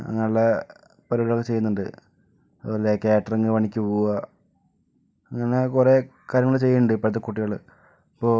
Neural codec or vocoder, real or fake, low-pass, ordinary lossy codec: none; real; none; none